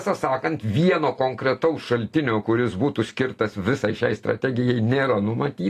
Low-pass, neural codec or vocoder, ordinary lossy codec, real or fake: 14.4 kHz; none; AAC, 48 kbps; real